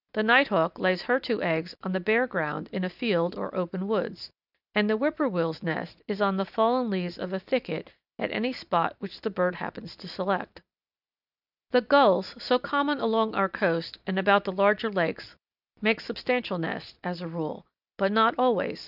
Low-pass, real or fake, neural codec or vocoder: 5.4 kHz; real; none